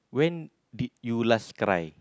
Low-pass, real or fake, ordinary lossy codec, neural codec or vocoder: none; real; none; none